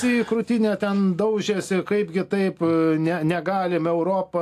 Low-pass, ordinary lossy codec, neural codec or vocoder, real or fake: 14.4 kHz; AAC, 64 kbps; none; real